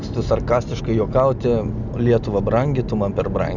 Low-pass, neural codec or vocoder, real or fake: 7.2 kHz; none; real